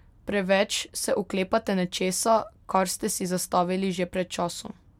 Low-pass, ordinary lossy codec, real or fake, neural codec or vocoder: 19.8 kHz; MP3, 96 kbps; real; none